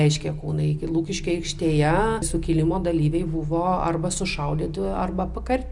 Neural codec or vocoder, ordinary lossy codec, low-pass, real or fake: none; Opus, 64 kbps; 10.8 kHz; real